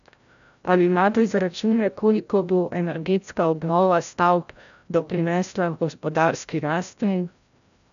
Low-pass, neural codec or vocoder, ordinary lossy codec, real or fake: 7.2 kHz; codec, 16 kHz, 0.5 kbps, FreqCodec, larger model; none; fake